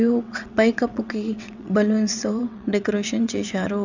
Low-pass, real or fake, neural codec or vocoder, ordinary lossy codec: 7.2 kHz; fake; vocoder, 22.05 kHz, 80 mel bands, WaveNeXt; none